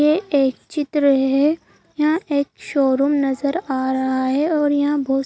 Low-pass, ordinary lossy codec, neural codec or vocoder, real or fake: none; none; none; real